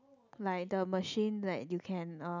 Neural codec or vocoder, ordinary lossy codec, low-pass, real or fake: none; none; 7.2 kHz; real